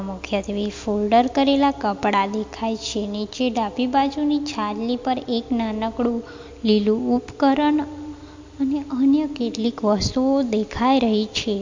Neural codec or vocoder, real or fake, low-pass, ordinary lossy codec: none; real; 7.2 kHz; MP3, 48 kbps